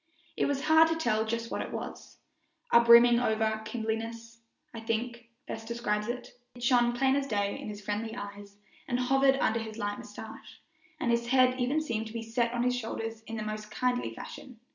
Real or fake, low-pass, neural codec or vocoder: real; 7.2 kHz; none